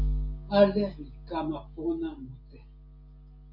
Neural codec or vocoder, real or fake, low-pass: none; real; 5.4 kHz